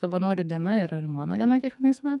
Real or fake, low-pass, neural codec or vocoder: fake; 10.8 kHz; codec, 44.1 kHz, 2.6 kbps, SNAC